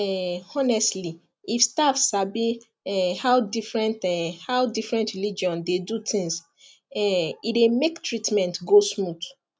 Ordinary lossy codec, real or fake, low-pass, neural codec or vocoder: none; real; none; none